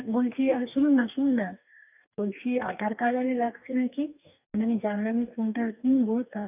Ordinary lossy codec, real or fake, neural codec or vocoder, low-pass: none; fake; codec, 44.1 kHz, 2.6 kbps, DAC; 3.6 kHz